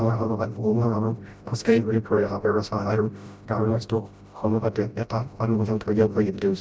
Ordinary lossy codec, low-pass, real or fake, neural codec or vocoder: none; none; fake; codec, 16 kHz, 0.5 kbps, FreqCodec, smaller model